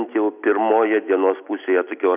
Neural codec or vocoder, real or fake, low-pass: none; real; 3.6 kHz